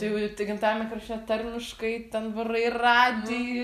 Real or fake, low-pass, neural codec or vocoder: real; 14.4 kHz; none